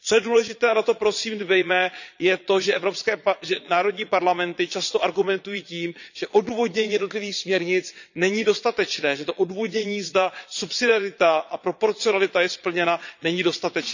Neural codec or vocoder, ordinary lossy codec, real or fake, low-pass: vocoder, 22.05 kHz, 80 mel bands, Vocos; none; fake; 7.2 kHz